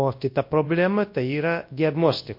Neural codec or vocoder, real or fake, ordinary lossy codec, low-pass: codec, 24 kHz, 0.9 kbps, WavTokenizer, large speech release; fake; MP3, 32 kbps; 5.4 kHz